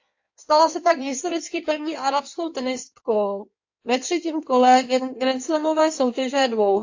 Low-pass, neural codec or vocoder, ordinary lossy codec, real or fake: 7.2 kHz; codec, 16 kHz in and 24 kHz out, 1.1 kbps, FireRedTTS-2 codec; AAC, 48 kbps; fake